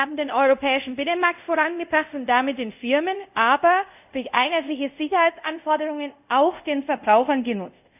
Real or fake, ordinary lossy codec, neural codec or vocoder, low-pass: fake; none; codec, 24 kHz, 0.5 kbps, DualCodec; 3.6 kHz